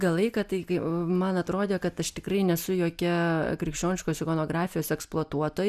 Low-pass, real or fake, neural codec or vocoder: 14.4 kHz; real; none